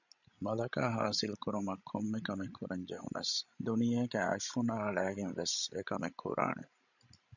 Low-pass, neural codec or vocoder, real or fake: 7.2 kHz; codec, 16 kHz, 16 kbps, FreqCodec, larger model; fake